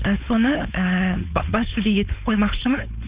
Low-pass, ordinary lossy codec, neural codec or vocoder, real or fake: 3.6 kHz; Opus, 64 kbps; codec, 16 kHz, 4.8 kbps, FACodec; fake